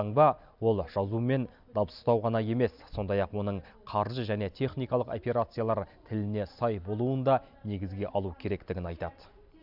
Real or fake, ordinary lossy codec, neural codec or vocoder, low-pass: real; none; none; 5.4 kHz